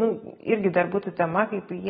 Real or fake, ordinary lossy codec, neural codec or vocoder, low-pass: real; AAC, 16 kbps; none; 10.8 kHz